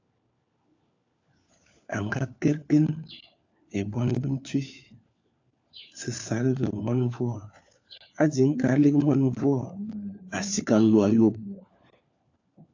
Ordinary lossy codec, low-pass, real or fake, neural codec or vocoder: AAC, 48 kbps; 7.2 kHz; fake; codec, 16 kHz, 4 kbps, FunCodec, trained on LibriTTS, 50 frames a second